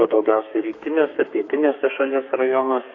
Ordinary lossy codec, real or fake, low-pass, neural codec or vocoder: AAC, 48 kbps; fake; 7.2 kHz; codec, 32 kHz, 1.9 kbps, SNAC